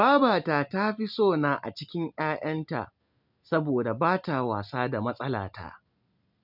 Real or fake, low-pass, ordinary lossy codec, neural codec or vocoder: real; 5.4 kHz; none; none